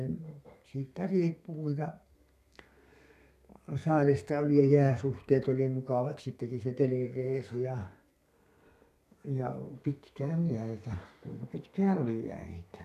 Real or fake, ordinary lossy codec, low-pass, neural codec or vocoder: fake; none; 14.4 kHz; codec, 32 kHz, 1.9 kbps, SNAC